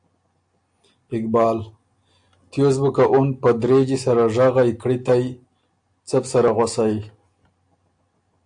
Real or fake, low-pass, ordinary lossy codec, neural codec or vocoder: real; 9.9 kHz; AAC, 64 kbps; none